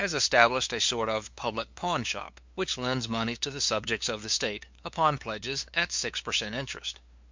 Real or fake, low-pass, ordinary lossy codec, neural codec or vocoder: fake; 7.2 kHz; MP3, 64 kbps; codec, 16 kHz, 2 kbps, FunCodec, trained on LibriTTS, 25 frames a second